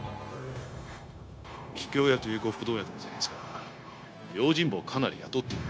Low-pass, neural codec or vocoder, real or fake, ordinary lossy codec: none; codec, 16 kHz, 0.9 kbps, LongCat-Audio-Codec; fake; none